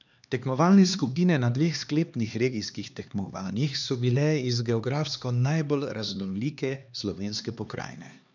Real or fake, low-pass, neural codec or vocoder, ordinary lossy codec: fake; 7.2 kHz; codec, 16 kHz, 2 kbps, X-Codec, HuBERT features, trained on LibriSpeech; none